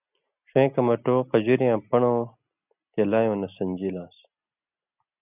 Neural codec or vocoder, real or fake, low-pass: none; real; 3.6 kHz